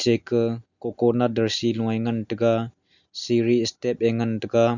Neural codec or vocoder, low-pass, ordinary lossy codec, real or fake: none; 7.2 kHz; none; real